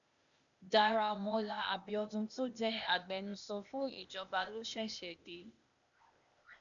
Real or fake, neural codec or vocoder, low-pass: fake; codec, 16 kHz, 0.8 kbps, ZipCodec; 7.2 kHz